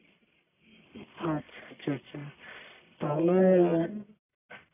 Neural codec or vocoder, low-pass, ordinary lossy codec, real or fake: codec, 44.1 kHz, 1.7 kbps, Pupu-Codec; 3.6 kHz; none; fake